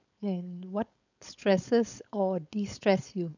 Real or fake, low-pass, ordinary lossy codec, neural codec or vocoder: fake; 7.2 kHz; none; codec, 16 kHz, 4.8 kbps, FACodec